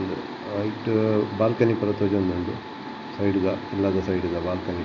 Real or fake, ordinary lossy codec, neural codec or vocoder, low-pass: real; none; none; 7.2 kHz